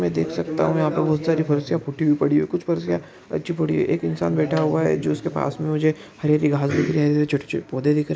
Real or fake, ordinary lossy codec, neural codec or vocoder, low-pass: real; none; none; none